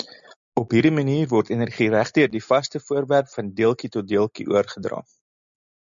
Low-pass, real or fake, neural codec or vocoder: 7.2 kHz; real; none